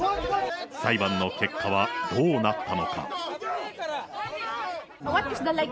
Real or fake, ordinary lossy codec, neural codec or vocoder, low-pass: real; none; none; none